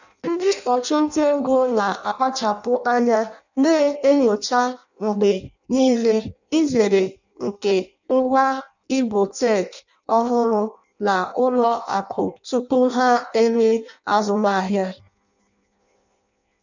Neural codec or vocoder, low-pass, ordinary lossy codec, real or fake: codec, 16 kHz in and 24 kHz out, 0.6 kbps, FireRedTTS-2 codec; 7.2 kHz; none; fake